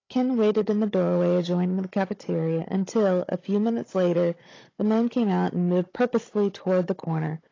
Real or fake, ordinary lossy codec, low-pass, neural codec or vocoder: fake; AAC, 32 kbps; 7.2 kHz; codec, 16 kHz, 16 kbps, FunCodec, trained on Chinese and English, 50 frames a second